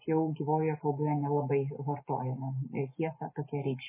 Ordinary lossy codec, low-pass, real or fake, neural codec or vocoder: MP3, 16 kbps; 3.6 kHz; real; none